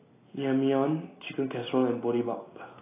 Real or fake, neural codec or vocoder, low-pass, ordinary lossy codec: real; none; 3.6 kHz; AAC, 16 kbps